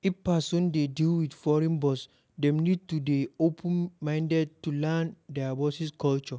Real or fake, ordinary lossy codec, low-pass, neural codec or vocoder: real; none; none; none